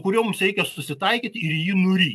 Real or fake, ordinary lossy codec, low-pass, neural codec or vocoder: real; AAC, 96 kbps; 14.4 kHz; none